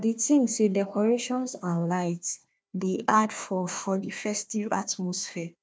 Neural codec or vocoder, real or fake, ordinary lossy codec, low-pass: codec, 16 kHz, 1 kbps, FunCodec, trained on Chinese and English, 50 frames a second; fake; none; none